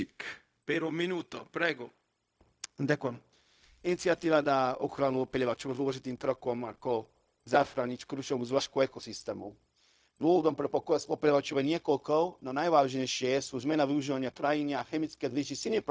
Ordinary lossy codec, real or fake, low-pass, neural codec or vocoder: none; fake; none; codec, 16 kHz, 0.4 kbps, LongCat-Audio-Codec